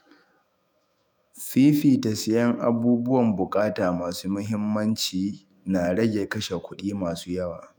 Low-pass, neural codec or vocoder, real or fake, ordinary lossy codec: none; autoencoder, 48 kHz, 128 numbers a frame, DAC-VAE, trained on Japanese speech; fake; none